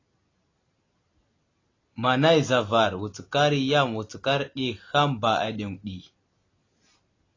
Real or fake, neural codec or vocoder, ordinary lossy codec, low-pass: real; none; AAC, 32 kbps; 7.2 kHz